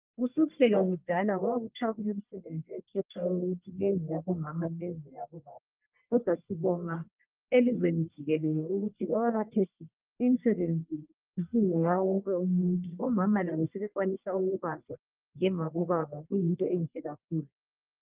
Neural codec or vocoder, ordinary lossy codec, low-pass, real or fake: codec, 44.1 kHz, 1.7 kbps, Pupu-Codec; Opus, 32 kbps; 3.6 kHz; fake